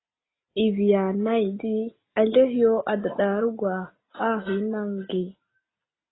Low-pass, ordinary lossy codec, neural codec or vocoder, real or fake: 7.2 kHz; AAC, 16 kbps; none; real